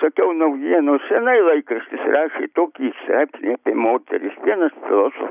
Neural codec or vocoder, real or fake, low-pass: none; real; 3.6 kHz